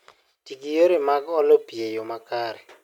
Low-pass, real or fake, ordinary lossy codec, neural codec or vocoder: 19.8 kHz; real; MP3, 96 kbps; none